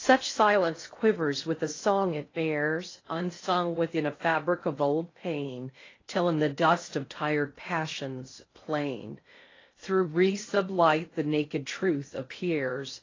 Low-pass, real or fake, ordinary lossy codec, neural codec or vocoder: 7.2 kHz; fake; AAC, 32 kbps; codec, 16 kHz in and 24 kHz out, 0.6 kbps, FocalCodec, streaming, 2048 codes